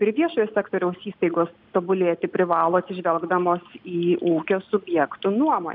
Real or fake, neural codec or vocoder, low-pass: real; none; 5.4 kHz